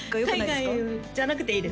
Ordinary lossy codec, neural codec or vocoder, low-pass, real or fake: none; none; none; real